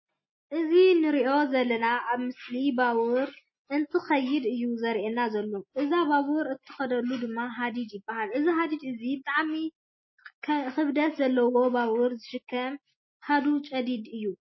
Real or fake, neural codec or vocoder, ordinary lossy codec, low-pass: real; none; MP3, 24 kbps; 7.2 kHz